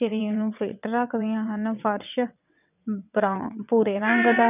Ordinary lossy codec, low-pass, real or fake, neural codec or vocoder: none; 3.6 kHz; fake; vocoder, 22.05 kHz, 80 mel bands, WaveNeXt